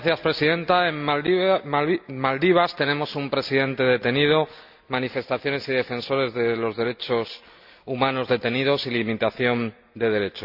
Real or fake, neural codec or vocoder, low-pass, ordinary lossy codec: real; none; 5.4 kHz; AAC, 48 kbps